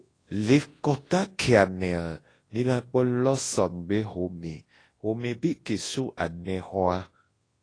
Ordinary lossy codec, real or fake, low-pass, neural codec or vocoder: AAC, 32 kbps; fake; 9.9 kHz; codec, 24 kHz, 0.9 kbps, WavTokenizer, large speech release